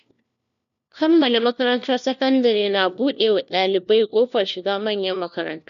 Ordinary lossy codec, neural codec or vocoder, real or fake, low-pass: none; codec, 16 kHz, 1 kbps, FunCodec, trained on LibriTTS, 50 frames a second; fake; 7.2 kHz